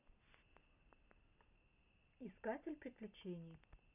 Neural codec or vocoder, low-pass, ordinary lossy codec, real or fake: none; 3.6 kHz; none; real